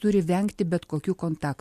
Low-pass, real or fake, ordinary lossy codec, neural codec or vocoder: 14.4 kHz; real; MP3, 64 kbps; none